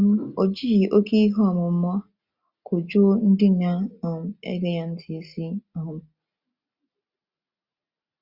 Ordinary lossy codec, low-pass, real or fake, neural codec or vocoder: Opus, 64 kbps; 5.4 kHz; real; none